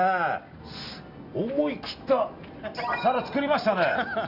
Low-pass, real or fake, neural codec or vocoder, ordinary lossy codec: 5.4 kHz; real; none; none